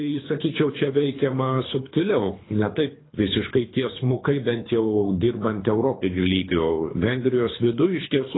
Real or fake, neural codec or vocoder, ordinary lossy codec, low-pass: fake; codec, 24 kHz, 3 kbps, HILCodec; AAC, 16 kbps; 7.2 kHz